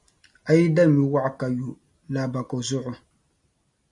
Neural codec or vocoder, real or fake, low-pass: none; real; 10.8 kHz